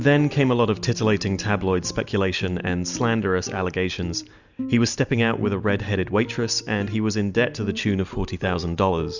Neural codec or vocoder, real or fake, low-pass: none; real; 7.2 kHz